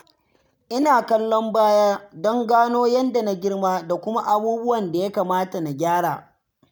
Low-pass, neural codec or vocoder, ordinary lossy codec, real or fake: none; none; none; real